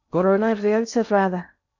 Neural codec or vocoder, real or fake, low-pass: codec, 16 kHz in and 24 kHz out, 0.6 kbps, FocalCodec, streaming, 2048 codes; fake; 7.2 kHz